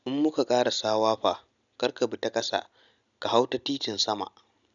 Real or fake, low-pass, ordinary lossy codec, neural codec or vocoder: real; 7.2 kHz; none; none